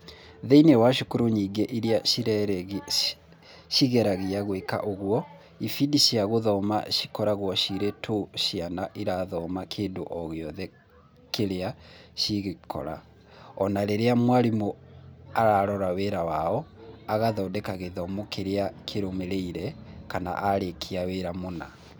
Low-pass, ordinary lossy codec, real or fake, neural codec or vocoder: none; none; real; none